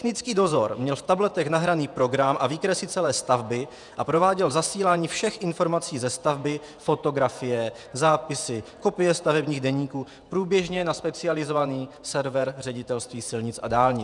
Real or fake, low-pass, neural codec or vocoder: fake; 10.8 kHz; vocoder, 48 kHz, 128 mel bands, Vocos